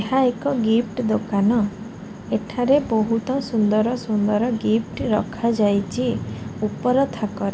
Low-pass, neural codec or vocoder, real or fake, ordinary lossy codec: none; none; real; none